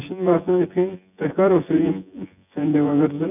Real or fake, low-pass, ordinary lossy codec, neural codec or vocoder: fake; 3.6 kHz; none; vocoder, 24 kHz, 100 mel bands, Vocos